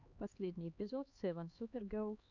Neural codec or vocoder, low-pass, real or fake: codec, 16 kHz, 2 kbps, X-Codec, HuBERT features, trained on LibriSpeech; 7.2 kHz; fake